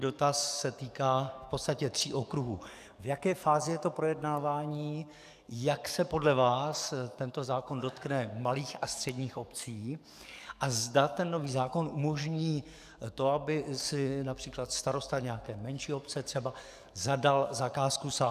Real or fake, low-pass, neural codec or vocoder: fake; 14.4 kHz; codec, 44.1 kHz, 7.8 kbps, DAC